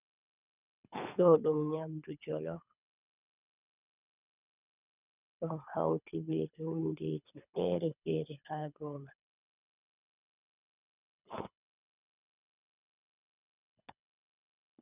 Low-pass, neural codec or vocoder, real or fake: 3.6 kHz; codec, 24 kHz, 3 kbps, HILCodec; fake